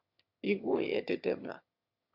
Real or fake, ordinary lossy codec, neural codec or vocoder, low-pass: fake; Opus, 64 kbps; autoencoder, 22.05 kHz, a latent of 192 numbers a frame, VITS, trained on one speaker; 5.4 kHz